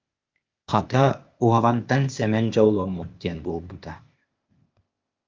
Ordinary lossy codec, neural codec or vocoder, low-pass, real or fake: Opus, 24 kbps; codec, 16 kHz, 0.8 kbps, ZipCodec; 7.2 kHz; fake